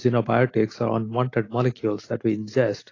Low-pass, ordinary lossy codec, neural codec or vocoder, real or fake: 7.2 kHz; AAC, 32 kbps; none; real